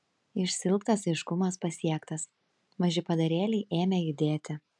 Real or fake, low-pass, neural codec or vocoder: real; 10.8 kHz; none